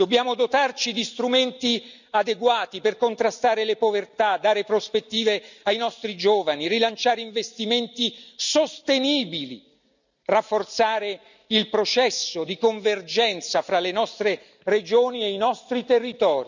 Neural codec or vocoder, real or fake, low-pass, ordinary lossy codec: none; real; 7.2 kHz; none